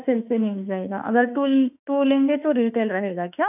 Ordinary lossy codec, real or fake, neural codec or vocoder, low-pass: none; fake; autoencoder, 48 kHz, 32 numbers a frame, DAC-VAE, trained on Japanese speech; 3.6 kHz